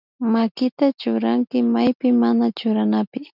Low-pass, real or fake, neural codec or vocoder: 5.4 kHz; real; none